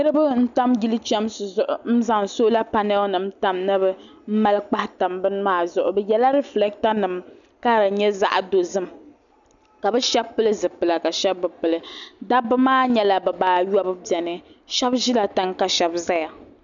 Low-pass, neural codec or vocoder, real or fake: 7.2 kHz; none; real